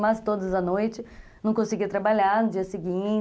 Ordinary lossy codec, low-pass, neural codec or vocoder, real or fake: none; none; none; real